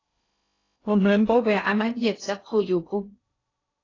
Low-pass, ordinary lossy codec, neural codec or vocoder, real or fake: 7.2 kHz; AAC, 32 kbps; codec, 16 kHz in and 24 kHz out, 0.6 kbps, FocalCodec, streaming, 2048 codes; fake